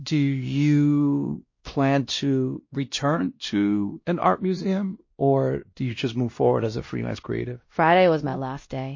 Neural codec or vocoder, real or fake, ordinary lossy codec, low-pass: codec, 16 kHz, 1 kbps, X-Codec, WavLM features, trained on Multilingual LibriSpeech; fake; MP3, 32 kbps; 7.2 kHz